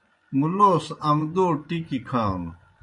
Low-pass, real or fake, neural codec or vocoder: 10.8 kHz; fake; vocoder, 24 kHz, 100 mel bands, Vocos